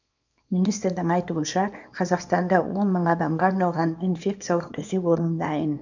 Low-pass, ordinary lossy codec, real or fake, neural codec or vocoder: 7.2 kHz; none; fake; codec, 24 kHz, 0.9 kbps, WavTokenizer, small release